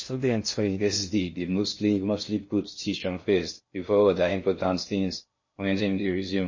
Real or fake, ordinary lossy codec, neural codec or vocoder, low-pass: fake; MP3, 32 kbps; codec, 16 kHz in and 24 kHz out, 0.6 kbps, FocalCodec, streaming, 2048 codes; 7.2 kHz